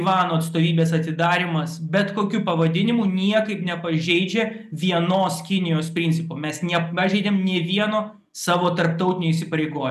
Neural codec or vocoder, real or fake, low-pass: none; real; 14.4 kHz